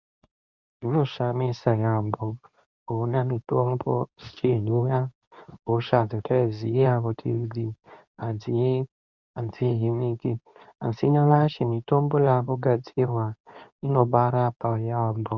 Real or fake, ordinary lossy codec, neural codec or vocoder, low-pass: fake; Opus, 64 kbps; codec, 24 kHz, 0.9 kbps, WavTokenizer, medium speech release version 2; 7.2 kHz